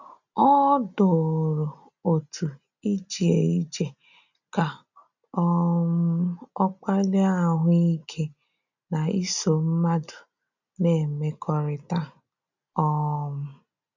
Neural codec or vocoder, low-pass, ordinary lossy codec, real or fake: none; 7.2 kHz; none; real